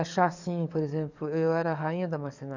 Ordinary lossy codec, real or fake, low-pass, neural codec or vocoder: none; fake; 7.2 kHz; codec, 44.1 kHz, 7.8 kbps, DAC